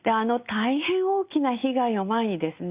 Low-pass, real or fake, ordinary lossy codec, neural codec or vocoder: 3.6 kHz; real; Opus, 64 kbps; none